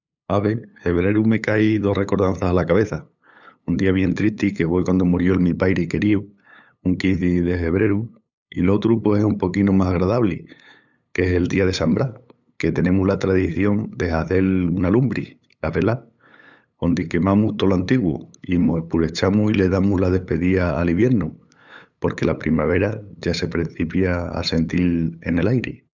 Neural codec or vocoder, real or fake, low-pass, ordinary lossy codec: codec, 16 kHz, 8 kbps, FunCodec, trained on LibriTTS, 25 frames a second; fake; 7.2 kHz; none